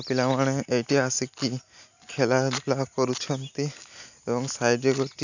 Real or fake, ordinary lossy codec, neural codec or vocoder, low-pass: real; none; none; 7.2 kHz